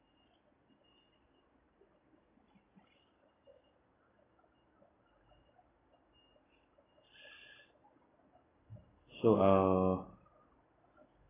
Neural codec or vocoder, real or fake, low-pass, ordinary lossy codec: none; real; 3.6 kHz; AAC, 16 kbps